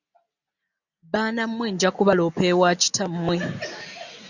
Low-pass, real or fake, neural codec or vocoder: 7.2 kHz; real; none